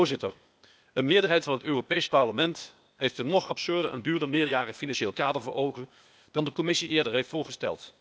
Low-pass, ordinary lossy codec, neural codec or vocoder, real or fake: none; none; codec, 16 kHz, 0.8 kbps, ZipCodec; fake